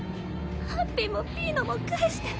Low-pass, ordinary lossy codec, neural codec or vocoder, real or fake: none; none; none; real